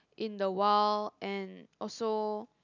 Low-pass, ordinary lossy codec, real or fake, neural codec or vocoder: 7.2 kHz; none; real; none